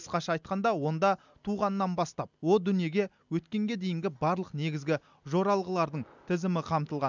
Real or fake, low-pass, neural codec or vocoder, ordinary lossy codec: real; 7.2 kHz; none; none